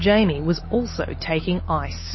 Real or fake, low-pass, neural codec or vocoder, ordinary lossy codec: real; 7.2 kHz; none; MP3, 24 kbps